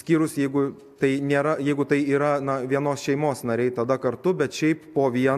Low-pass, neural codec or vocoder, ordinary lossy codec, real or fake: 14.4 kHz; none; MP3, 96 kbps; real